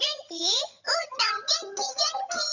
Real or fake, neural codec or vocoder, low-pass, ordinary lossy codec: fake; vocoder, 22.05 kHz, 80 mel bands, HiFi-GAN; 7.2 kHz; none